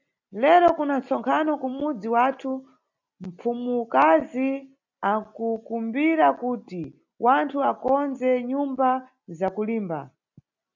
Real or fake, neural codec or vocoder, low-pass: real; none; 7.2 kHz